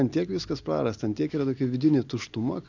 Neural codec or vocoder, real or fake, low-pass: none; real; 7.2 kHz